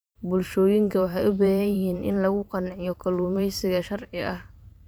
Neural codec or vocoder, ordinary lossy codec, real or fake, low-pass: vocoder, 44.1 kHz, 128 mel bands every 512 samples, BigVGAN v2; none; fake; none